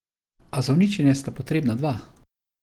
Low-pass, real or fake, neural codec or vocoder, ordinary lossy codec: 19.8 kHz; real; none; Opus, 16 kbps